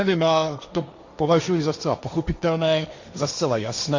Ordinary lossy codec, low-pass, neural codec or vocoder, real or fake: Opus, 64 kbps; 7.2 kHz; codec, 16 kHz, 1.1 kbps, Voila-Tokenizer; fake